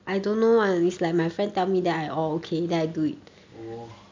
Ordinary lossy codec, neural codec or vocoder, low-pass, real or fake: MP3, 64 kbps; none; 7.2 kHz; real